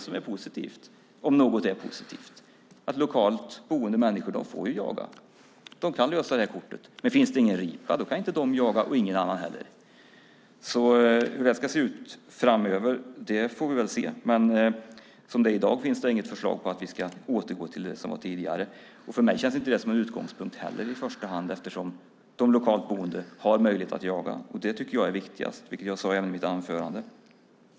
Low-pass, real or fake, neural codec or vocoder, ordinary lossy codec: none; real; none; none